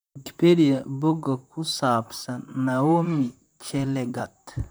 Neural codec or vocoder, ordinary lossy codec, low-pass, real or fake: vocoder, 44.1 kHz, 128 mel bands, Pupu-Vocoder; none; none; fake